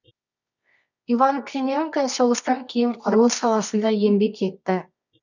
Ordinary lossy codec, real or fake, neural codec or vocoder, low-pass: none; fake; codec, 24 kHz, 0.9 kbps, WavTokenizer, medium music audio release; 7.2 kHz